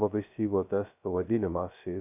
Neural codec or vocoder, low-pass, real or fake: codec, 16 kHz, 0.2 kbps, FocalCodec; 3.6 kHz; fake